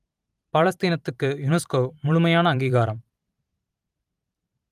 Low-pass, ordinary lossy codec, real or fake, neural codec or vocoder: 14.4 kHz; Opus, 32 kbps; fake; vocoder, 44.1 kHz, 128 mel bands every 512 samples, BigVGAN v2